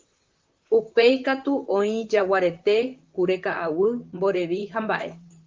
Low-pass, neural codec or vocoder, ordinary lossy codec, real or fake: 7.2 kHz; vocoder, 44.1 kHz, 128 mel bands, Pupu-Vocoder; Opus, 32 kbps; fake